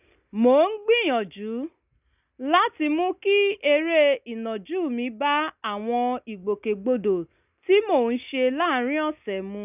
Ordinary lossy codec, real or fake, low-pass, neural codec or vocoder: none; real; 3.6 kHz; none